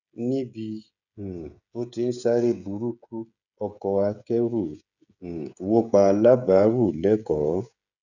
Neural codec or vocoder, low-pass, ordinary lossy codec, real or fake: codec, 16 kHz, 16 kbps, FreqCodec, smaller model; 7.2 kHz; none; fake